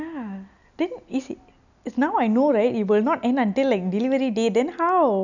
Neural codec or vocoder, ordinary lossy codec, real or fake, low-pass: none; none; real; 7.2 kHz